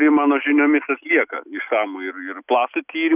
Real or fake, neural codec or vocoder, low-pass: real; none; 3.6 kHz